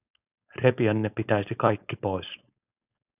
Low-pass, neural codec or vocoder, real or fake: 3.6 kHz; codec, 16 kHz, 4.8 kbps, FACodec; fake